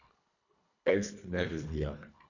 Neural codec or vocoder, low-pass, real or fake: codec, 24 kHz, 1.5 kbps, HILCodec; 7.2 kHz; fake